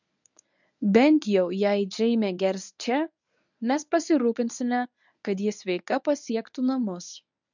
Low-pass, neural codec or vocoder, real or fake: 7.2 kHz; codec, 24 kHz, 0.9 kbps, WavTokenizer, medium speech release version 1; fake